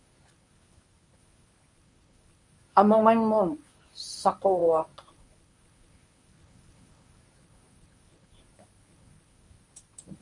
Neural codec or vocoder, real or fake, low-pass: codec, 24 kHz, 0.9 kbps, WavTokenizer, medium speech release version 1; fake; 10.8 kHz